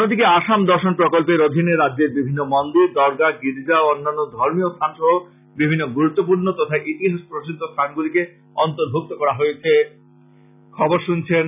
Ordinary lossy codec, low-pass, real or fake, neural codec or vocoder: none; 3.6 kHz; real; none